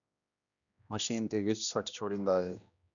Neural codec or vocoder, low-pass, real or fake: codec, 16 kHz, 1 kbps, X-Codec, HuBERT features, trained on general audio; 7.2 kHz; fake